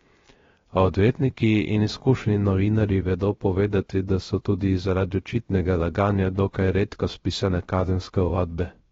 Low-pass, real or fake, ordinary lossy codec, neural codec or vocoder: 7.2 kHz; fake; AAC, 24 kbps; codec, 16 kHz, 0.3 kbps, FocalCodec